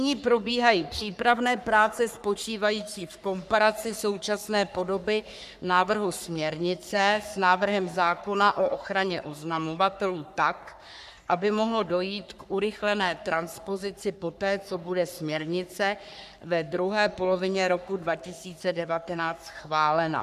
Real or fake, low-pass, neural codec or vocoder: fake; 14.4 kHz; codec, 44.1 kHz, 3.4 kbps, Pupu-Codec